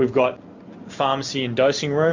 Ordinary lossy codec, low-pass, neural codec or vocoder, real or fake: MP3, 64 kbps; 7.2 kHz; none; real